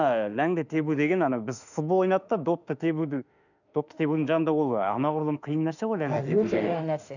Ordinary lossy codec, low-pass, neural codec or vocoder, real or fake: none; 7.2 kHz; autoencoder, 48 kHz, 32 numbers a frame, DAC-VAE, trained on Japanese speech; fake